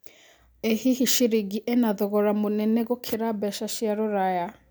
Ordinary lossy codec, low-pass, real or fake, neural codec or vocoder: none; none; real; none